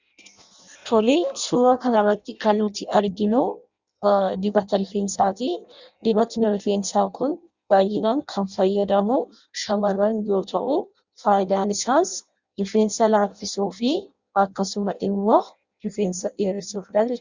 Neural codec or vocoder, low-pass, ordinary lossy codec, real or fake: codec, 16 kHz in and 24 kHz out, 0.6 kbps, FireRedTTS-2 codec; 7.2 kHz; Opus, 64 kbps; fake